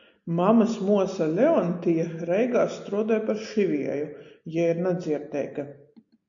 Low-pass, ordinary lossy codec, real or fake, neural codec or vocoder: 7.2 kHz; AAC, 64 kbps; real; none